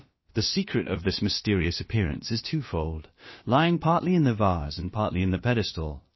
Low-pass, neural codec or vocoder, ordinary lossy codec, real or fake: 7.2 kHz; codec, 16 kHz, about 1 kbps, DyCAST, with the encoder's durations; MP3, 24 kbps; fake